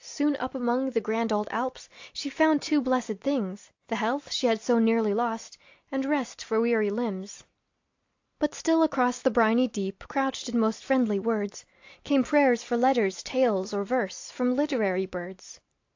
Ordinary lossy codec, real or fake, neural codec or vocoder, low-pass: AAC, 48 kbps; real; none; 7.2 kHz